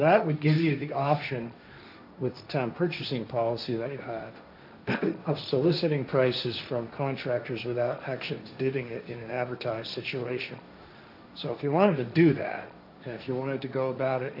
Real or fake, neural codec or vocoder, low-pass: fake; codec, 16 kHz, 1.1 kbps, Voila-Tokenizer; 5.4 kHz